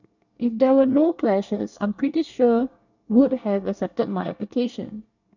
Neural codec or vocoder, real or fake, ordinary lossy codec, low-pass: codec, 24 kHz, 1 kbps, SNAC; fake; none; 7.2 kHz